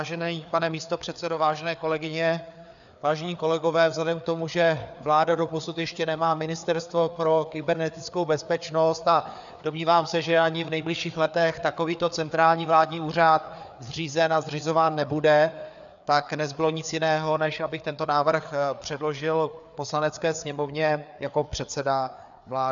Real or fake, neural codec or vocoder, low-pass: fake; codec, 16 kHz, 4 kbps, FreqCodec, larger model; 7.2 kHz